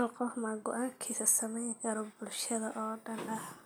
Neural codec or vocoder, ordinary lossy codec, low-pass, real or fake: none; none; none; real